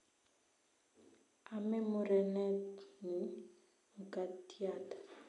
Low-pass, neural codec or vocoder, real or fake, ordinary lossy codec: 10.8 kHz; none; real; none